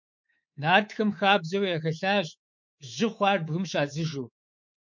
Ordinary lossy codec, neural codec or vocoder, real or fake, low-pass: MP3, 48 kbps; codec, 24 kHz, 3.1 kbps, DualCodec; fake; 7.2 kHz